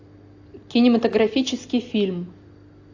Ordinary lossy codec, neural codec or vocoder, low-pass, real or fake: AAC, 48 kbps; none; 7.2 kHz; real